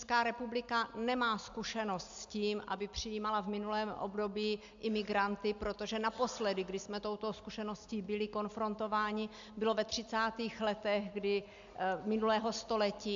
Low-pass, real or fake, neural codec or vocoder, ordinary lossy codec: 7.2 kHz; real; none; AAC, 64 kbps